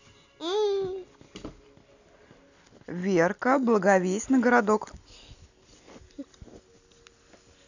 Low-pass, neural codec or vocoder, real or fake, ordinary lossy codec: 7.2 kHz; none; real; none